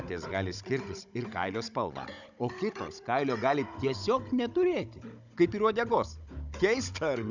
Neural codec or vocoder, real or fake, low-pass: codec, 16 kHz, 16 kbps, FunCodec, trained on Chinese and English, 50 frames a second; fake; 7.2 kHz